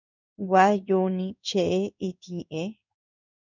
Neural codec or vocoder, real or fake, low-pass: codec, 16 kHz in and 24 kHz out, 1 kbps, XY-Tokenizer; fake; 7.2 kHz